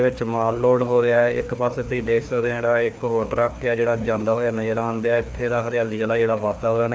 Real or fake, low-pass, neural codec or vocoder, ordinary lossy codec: fake; none; codec, 16 kHz, 2 kbps, FreqCodec, larger model; none